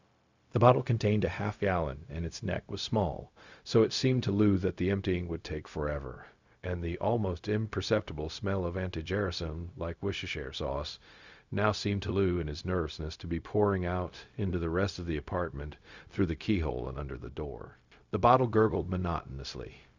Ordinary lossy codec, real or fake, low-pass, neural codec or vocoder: Opus, 64 kbps; fake; 7.2 kHz; codec, 16 kHz, 0.4 kbps, LongCat-Audio-Codec